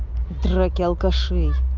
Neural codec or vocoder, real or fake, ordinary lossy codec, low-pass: none; real; Opus, 24 kbps; 7.2 kHz